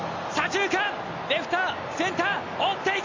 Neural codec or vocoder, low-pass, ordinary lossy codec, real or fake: none; 7.2 kHz; MP3, 64 kbps; real